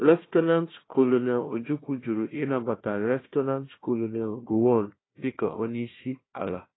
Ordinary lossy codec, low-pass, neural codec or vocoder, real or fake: AAC, 16 kbps; 7.2 kHz; codec, 16 kHz, 1 kbps, FunCodec, trained on Chinese and English, 50 frames a second; fake